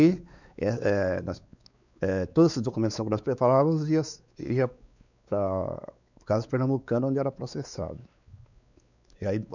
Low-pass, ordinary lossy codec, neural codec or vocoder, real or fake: 7.2 kHz; none; codec, 16 kHz, 4 kbps, X-Codec, WavLM features, trained on Multilingual LibriSpeech; fake